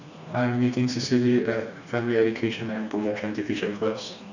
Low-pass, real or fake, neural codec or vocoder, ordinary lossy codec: 7.2 kHz; fake; codec, 16 kHz, 2 kbps, FreqCodec, smaller model; none